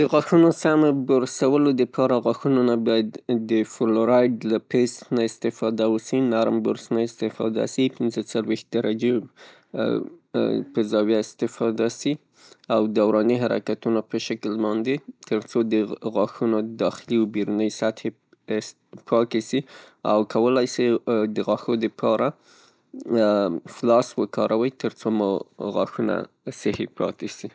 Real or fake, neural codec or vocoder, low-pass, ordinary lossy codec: real; none; none; none